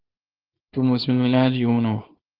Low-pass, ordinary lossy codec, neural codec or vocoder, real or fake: 5.4 kHz; Opus, 32 kbps; codec, 24 kHz, 0.9 kbps, WavTokenizer, small release; fake